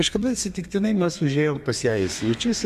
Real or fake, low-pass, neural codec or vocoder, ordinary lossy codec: fake; 14.4 kHz; codec, 32 kHz, 1.9 kbps, SNAC; MP3, 96 kbps